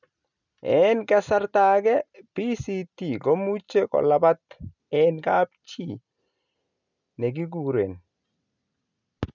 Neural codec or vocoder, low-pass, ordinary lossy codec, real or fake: none; 7.2 kHz; none; real